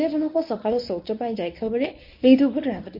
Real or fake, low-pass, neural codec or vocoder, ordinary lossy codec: fake; 5.4 kHz; codec, 24 kHz, 0.9 kbps, WavTokenizer, medium speech release version 1; MP3, 32 kbps